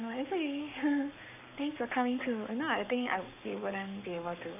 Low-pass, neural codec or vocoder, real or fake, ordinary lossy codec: 3.6 kHz; codec, 44.1 kHz, 7.8 kbps, Pupu-Codec; fake; none